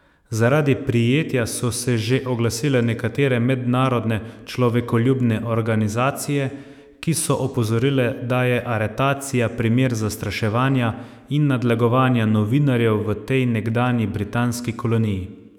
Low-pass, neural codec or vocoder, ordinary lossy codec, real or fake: 19.8 kHz; autoencoder, 48 kHz, 128 numbers a frame, DAC-VAE, trained on Japanese speech; none; fake